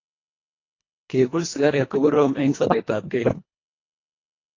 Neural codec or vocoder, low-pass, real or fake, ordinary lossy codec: codec, 24 kHz, 1.5 kbps, HILCodec; 7.2 kHz; fake; AAC, 32 kbps